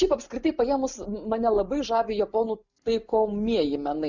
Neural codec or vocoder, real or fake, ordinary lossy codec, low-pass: none; real; Opus, 64 kbps; 7.2 kHz